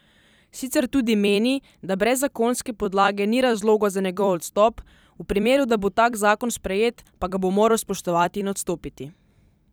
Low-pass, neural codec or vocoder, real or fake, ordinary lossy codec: none; vocoder, 44.1 kHz, 128 mel bands every 256 samples, BigVGAN v2; fake; none